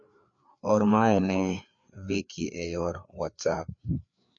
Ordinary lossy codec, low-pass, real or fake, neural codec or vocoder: MP3, 48 kbps; 7.2 kHz; fake; codec, 16 kHz, 4 kbps, FreqCodec, larger model